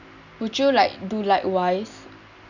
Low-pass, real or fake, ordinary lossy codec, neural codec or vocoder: 7.2 kHz; real; none; none